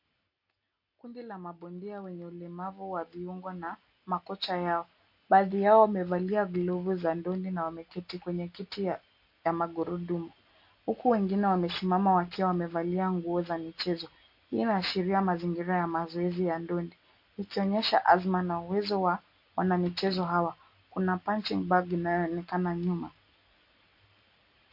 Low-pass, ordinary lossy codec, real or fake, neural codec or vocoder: 5.4 kHz; MP3, 32 kbps; real; none